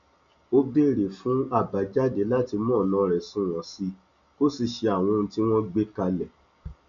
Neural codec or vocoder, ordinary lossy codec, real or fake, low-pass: none; MP3, 64 kbps; real; 7.2 kHz